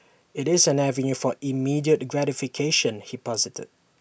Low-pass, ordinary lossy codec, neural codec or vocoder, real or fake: none; none; none; real